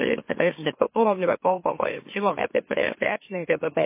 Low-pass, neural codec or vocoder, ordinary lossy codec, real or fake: 3.6 kHz; autoencoder, 44.1 kHz, a latent of 192 numbers a frame, MeloTTS; MP3, 24 kbps; fake